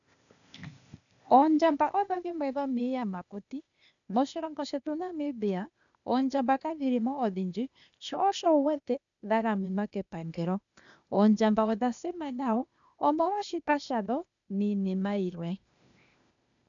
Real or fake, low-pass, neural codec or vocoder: fake; 7.2 kHz; codec, 16 kHz, 0.8 kbps, ZipCodec